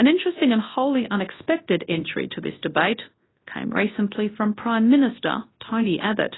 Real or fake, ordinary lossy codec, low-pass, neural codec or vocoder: fake; AAC, 16 kbps; 7.2 kHz; codec, 24 kHz, 0.9 kbps, WavTokenizer, large speech release